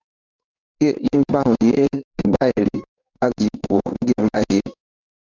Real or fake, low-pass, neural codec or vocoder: fake; 7.2 kHz; codec, 16 kHz in and 24 kHz out, 1 kbps, XY-Tokenizer